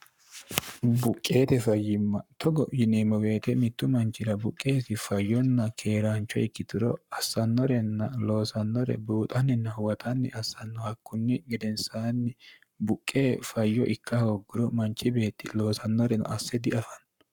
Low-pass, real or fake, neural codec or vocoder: 19.8 kHz; fake; codec, 44.1 kHz, 7.8 kbps, Pupu-Codec